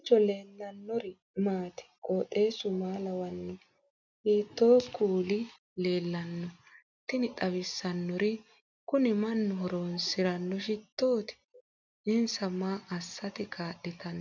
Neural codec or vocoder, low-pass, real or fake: none; 7.2 kHz; real